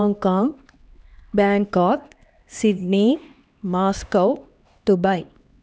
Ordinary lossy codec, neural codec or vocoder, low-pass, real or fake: none; codec, 16 kHz, 2 kbps, X-Codec, HuBERT features, trained on LibriSpeech; none; fake